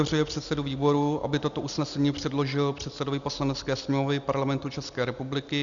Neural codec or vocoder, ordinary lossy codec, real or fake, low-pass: none; Opus, 64 kbps; real; 7.2 kHz